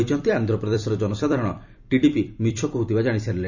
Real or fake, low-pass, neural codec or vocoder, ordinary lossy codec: fake; 7.2 kHz; vocoder, 44.1 kHz, 128 mel bands every 256 samples, BigVGAN v2; none